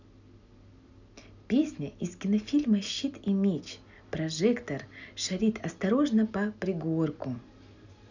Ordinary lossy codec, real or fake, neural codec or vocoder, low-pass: none; real; none; 7.2 kHz